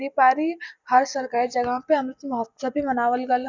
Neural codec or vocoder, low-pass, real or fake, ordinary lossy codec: none; 7.2 kHz; real; none